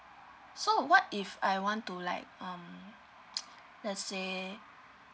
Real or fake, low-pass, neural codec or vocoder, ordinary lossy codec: real; none; none; none